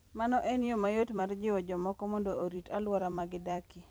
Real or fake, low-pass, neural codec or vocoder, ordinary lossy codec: fake; none; vocoder, 44.1 kHz, 128 mel bands every 256 samples, BigVGAN v2; none